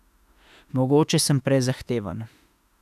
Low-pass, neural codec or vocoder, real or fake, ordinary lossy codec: 14.4 kHz; autoencoder, 48 kHz, 32 numbers a frame, DAC-VAE, trained on Japanese speech; fake; none